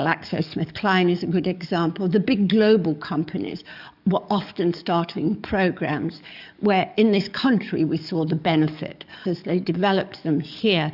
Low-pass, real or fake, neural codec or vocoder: 5.4 kHz; fake; codec, 44.1 kHz, 7.8 kbps, DAC